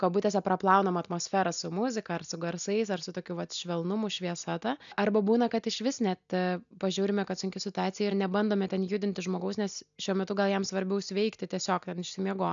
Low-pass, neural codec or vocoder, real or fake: 7.2 kHz; none; real